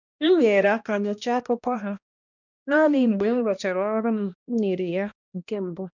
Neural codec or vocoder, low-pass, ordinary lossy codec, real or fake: codec, 16 kHz, 1 kbps, X-Codec, HuBERT features, trained on balanced general audio; 7.2 kHz; none; fake